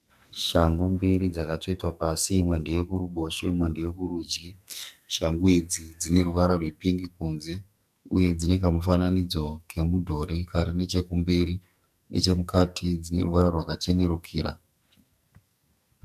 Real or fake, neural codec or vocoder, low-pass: fake; codec, 44.1 kHz, 2.6 kbps, SNAC; 14.4 kHz